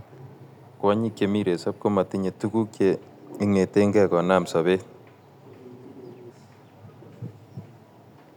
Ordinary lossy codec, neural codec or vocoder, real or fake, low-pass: none; none; real; 19.8 kHz